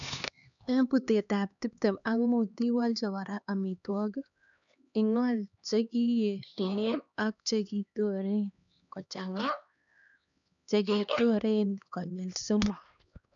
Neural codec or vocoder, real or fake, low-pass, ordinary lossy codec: codec, 16 kHz, 2 kbps, X-Codec, HuBERT features, trained on LibriSpeech; fake; 7.2 kHz; none